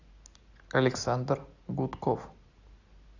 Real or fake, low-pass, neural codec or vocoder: real; 7.2 kHz; none